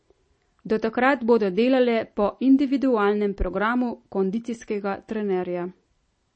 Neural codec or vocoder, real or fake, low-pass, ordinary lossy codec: none; real; 9.9 kHz; MP3, 32 kbps